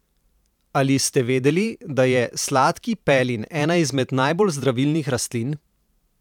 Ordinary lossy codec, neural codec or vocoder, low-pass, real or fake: none; vocoder, 44.1 kHz, 128 mel bands every 512 samples, BigVGAN v2; 19.8 kHz; fake